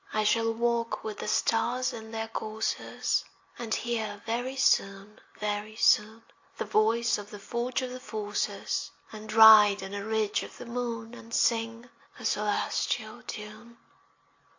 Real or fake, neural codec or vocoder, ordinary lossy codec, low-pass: real; none; AAC, 48 kbps; 7.2 kHz